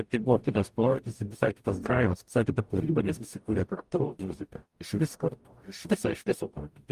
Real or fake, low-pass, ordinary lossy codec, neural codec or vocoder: fake; 14.4 kHz; Opus, 32 kbps; codec, 44.1 kHz, 0.9 kbps, DAC